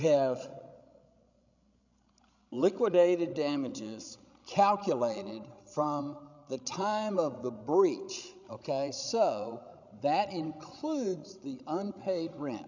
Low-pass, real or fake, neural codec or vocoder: 7.2 kHz; fake; codec, 16 kHz, 16 kbps, FreqCodec, larger model